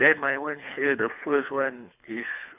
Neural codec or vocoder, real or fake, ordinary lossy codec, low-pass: codec, 24 kHz, 3 kbps, HILCodec; fake; none; 3.6 kHz